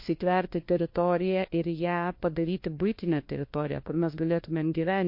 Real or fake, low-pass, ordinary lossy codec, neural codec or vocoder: fake; 5.4 kHz; MP3, 32 kbps; codec, 16 kHz, 1 kbps, FunCodec, trained on LibriTTS, 50 frames a second